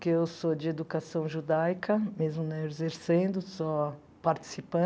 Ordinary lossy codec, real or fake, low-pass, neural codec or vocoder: none; real; none; none